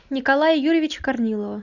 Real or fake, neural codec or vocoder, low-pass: real; none; 7.2 kHz